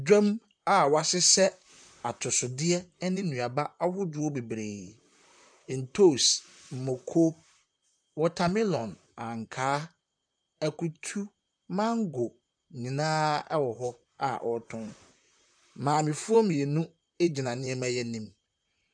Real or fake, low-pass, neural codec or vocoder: fake; 9.9 kHz; vocoder, 44.1 kHz, 128 mel bands, Pupu-Vocoder